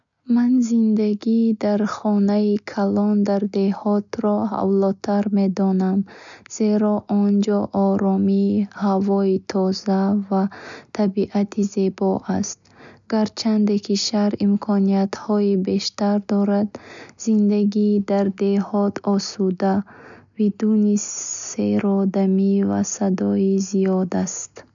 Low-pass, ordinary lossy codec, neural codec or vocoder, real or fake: 7.2 kHz; none; none; real